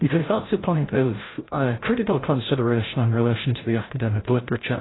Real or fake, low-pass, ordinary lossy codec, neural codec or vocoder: fake; 7.2 kHz; AAC, 16 kbps; codec, 16 kHz, 0.5 kbps, FreqCodec, larger model